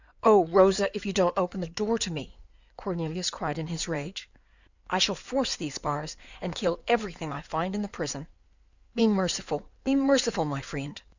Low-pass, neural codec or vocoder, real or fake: 7.2 kHz; codec, 16 kHz in and 24 kHz out, 2.2 kbps, FireRedTTS-2 codec; fake